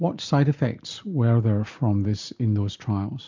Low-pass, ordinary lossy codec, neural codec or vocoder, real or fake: 7.2 kHz; MP3, 64 kbps; none; real